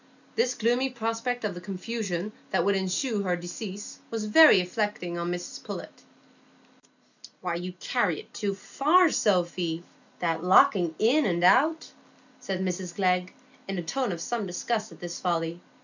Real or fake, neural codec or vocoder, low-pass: real; none; 7.2 kHz